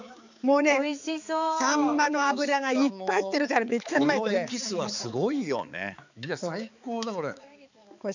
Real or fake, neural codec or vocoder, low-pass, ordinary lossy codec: fake; codec, 16 kHz, 4 kbps, X-Codec, HuBERT features, trained on balanced general audio; 7.2 kHz; none